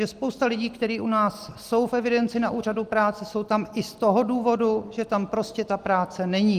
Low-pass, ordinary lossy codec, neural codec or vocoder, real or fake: 14.4 kHz; Opus, 24 kbps; none; real